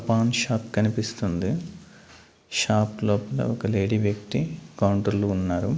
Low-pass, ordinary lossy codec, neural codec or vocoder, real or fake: none; none; none; real